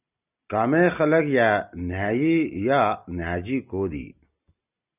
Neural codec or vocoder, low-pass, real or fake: none; 3.6 kHz; real